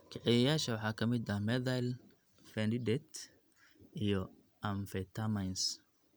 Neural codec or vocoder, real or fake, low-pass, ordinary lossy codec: none; real; none; none